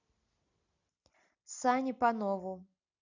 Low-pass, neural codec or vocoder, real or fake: 7.2 kHz; none; real